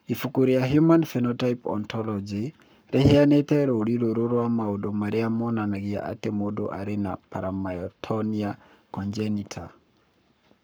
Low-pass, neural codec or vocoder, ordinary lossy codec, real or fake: none; codec, 44.1 kHz, 7.8 kbps, Pupu-Codec; none; fake